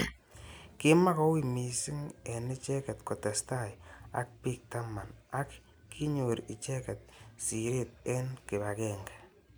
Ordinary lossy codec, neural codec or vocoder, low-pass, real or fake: none; none; none; real